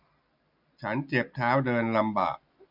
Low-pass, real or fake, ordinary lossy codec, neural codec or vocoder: 5.4 kHz; real; none; none